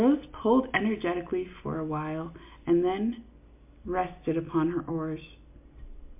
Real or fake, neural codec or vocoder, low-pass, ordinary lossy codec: real; none; 3.6 kHz; MP3, 32 kbps